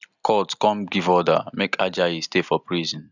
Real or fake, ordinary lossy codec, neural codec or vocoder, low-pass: real; none; none; 7.2 kHz